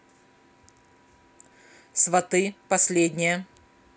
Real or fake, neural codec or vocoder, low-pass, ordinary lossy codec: real; none; none; none